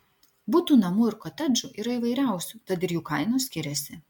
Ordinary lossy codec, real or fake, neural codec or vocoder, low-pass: MP3, 96 kbps; real; none; 19.8 kHz